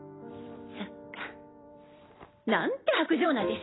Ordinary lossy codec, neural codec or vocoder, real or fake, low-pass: AAC, 16 kbps; vocoder, 44.1 kHz, 128 mel bands every 256 samples, BigVGAN v2; fake; 7.2 kHz